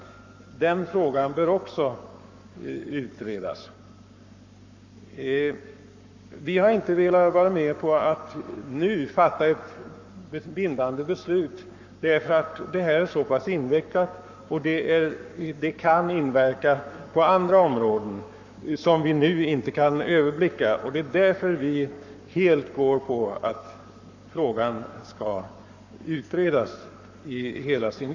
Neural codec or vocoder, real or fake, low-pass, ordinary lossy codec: codec, 44.1 kHz, 7.8 kbps, Pupu-Codec; fake; 7.2 kHz; none